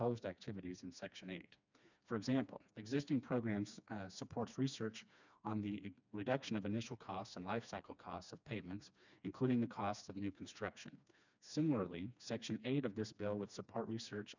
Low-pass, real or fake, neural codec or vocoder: 7.2 kHz; fake; codec, 16 kHz, 2 kbps, FreqCodec, smaller model